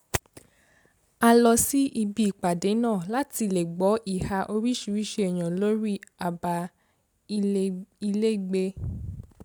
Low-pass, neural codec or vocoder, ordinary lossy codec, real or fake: none; none; none; real